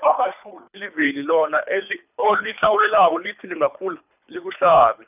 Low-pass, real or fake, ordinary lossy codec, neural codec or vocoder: 3.6 kHz; fake; none; codec, 24 kHz, 3 kbps, HILCodec